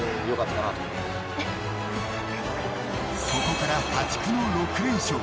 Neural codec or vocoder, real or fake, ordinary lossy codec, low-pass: none; real; none; none